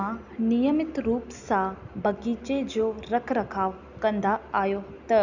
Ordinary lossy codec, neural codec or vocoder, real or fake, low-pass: none; none; real; 7.2 kHz